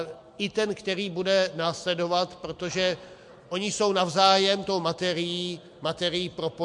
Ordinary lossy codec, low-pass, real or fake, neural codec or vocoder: MP3, 64 kbps; 10.8 kHz; real; none